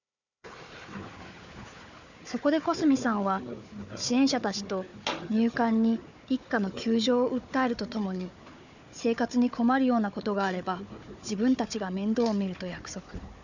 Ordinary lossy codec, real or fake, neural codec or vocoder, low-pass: none; fake; codec, 16 kHz, 4 kbps, FunCodec, trained on Chinese and English, 50 frames a second; 7.2 kHz